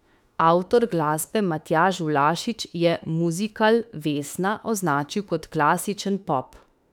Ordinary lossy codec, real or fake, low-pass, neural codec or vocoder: none; fake; 19.8 kHz; autoencoder, 48 kHz, 32 numbers a frame, DAC-VAE, trained on Japanese speech